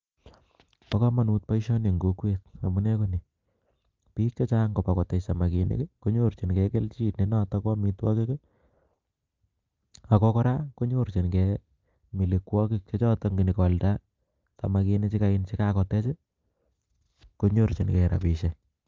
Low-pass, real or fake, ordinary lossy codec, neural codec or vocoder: 7.2 kHz; real; Opus, 24 kbps; none